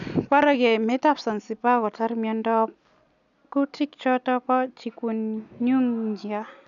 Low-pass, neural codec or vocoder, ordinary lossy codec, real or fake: 7.2 kHz; none; none; real